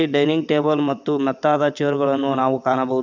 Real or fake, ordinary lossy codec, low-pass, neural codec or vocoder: fake; none; 7.2 kHz; vocoder, 22.05 kHz, 80 mel bands, WaveNeXt